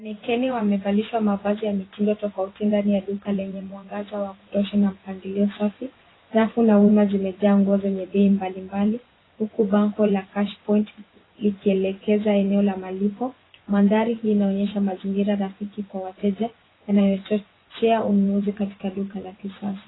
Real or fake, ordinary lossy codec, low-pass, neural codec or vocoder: fake; AAC, 16 kbps; 7.2 kHz; vocoder, 24 kHz, 100 mel bands, Vocos